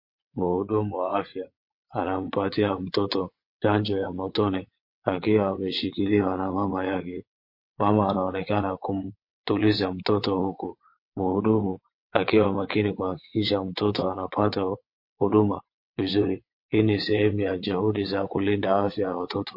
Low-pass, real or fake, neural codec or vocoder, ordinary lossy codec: 5.4 kHz; fake; vocoder, 22.05 kHz, 80 mel bands, WaveNeXt; MP3, 32 kbps